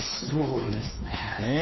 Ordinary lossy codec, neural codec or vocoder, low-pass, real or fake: MP3, 24 kbps; codec, 16 kHz, 2 kbps, X-Codec, WavLM features, trained on Multilingual LibriSpeech; 7.2 kHz; fake